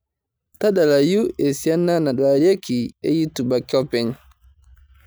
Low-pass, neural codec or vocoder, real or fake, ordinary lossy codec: none; none; real; none